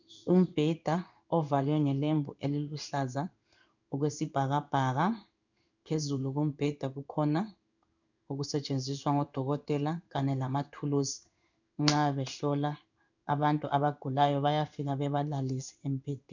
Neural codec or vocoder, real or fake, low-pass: codec, 16 kHz in and 24 kHz out, 1 kbps, XY-Tokenizer; fake; 7.2 kHz